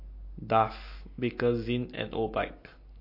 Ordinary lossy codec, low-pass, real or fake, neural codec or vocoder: MP3, 32 kbps; 5.4 kHz; real; none